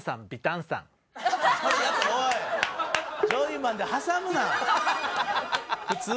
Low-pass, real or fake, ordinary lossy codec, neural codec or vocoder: none; real; none; none